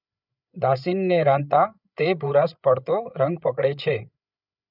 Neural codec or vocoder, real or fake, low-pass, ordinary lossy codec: codec, 16 kHz, 8 kbps, FreqCodec, larger model; fake; 5.4 kHz; none